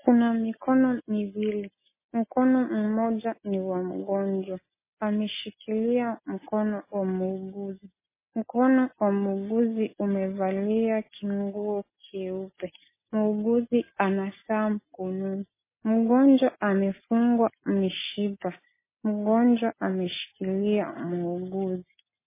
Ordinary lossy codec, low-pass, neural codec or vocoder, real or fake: MP3, 16 kbps; 3.6 kHz; none; real